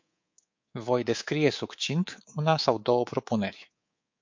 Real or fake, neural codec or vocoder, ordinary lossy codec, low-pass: fake; codec, 24 kHz, 3.1 kbps, DualCodec; MP3, 48 kbps; 7.2 kHz